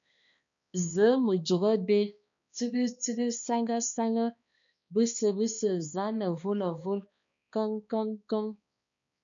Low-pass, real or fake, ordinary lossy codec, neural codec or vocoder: 7.2 kHz; fake; MP3, 96 kbps; codec, 16 kHz, 2 kbps, X-Codec, HuBERT features, trained on balanced general audio